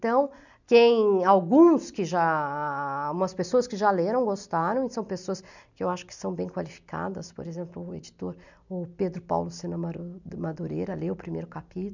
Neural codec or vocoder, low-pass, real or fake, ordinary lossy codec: none; 7.2 kHz; real; none